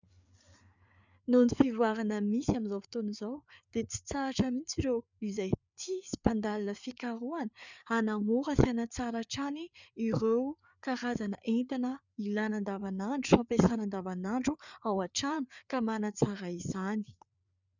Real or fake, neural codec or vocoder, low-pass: fake; codec, 16 kHz, 4 kbps, FreqCodec, larger model; 7.2 kHz